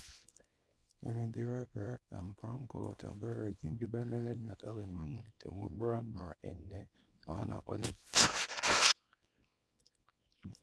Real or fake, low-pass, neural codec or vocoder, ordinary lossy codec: fake; none; codec, 24 kHz, 0.9 kbps, WavTokenizer, small release; none